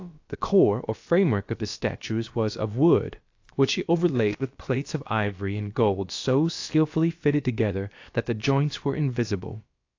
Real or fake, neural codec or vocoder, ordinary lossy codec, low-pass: fake; codec, 16 kHz, about 1 kbps, DyCAST, with the encoder's durations; AAC, 48 kbps; 7.2 kHz